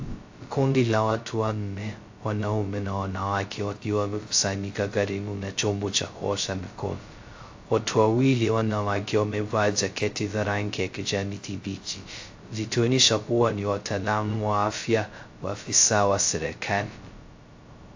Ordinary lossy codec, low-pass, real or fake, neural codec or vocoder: AAC, 48 kbps; 7.2 kHz; fake; codec, 16 kHz, 0.2 kbps, FocalCodec